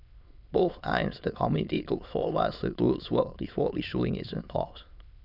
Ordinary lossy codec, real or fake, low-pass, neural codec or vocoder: none; fake; 5.4 kHz; autoencoder, 22.05 kHz, a latent of 192 numbers a frame, VITS, trained on many speakers